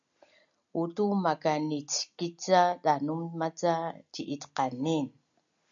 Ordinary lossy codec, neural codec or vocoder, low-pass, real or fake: MP3, 64 kbps; none; 7.2 kHz; real